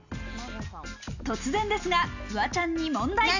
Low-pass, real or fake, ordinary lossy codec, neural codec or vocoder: 7.2 kHz; real; none; none